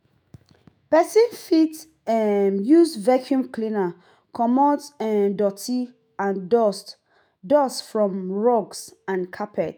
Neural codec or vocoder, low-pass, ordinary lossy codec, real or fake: autoencoder, 48 kHz, 128 numbers a frame, DAC-VAE, trained on Japanese speech; none; none; fake